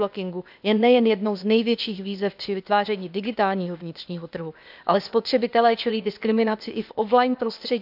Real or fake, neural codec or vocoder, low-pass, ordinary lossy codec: fake; codec, 16 kHz, 0.8 kbps, ZipCodec; 5.4 kHz; none